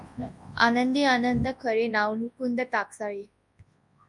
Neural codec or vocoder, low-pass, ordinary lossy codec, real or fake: codec, 24 kHz, 0.9 kbps, WavTokenizer, large speech release; 10.8 kHz; MP3, 64 kbps; fake